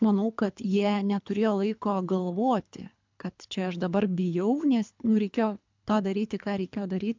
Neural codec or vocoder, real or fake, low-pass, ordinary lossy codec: codec, 24 kHz, 3 kbps, HILCodec; fake; 7.2 kHz; MP3, 64 kbps